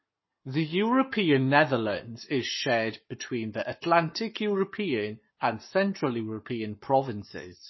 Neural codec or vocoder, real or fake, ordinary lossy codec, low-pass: codec, 44.1 kHz, 7.8 kbps, Pupu-Codec; fake; MP3, 24 kbps; 7.2 kHz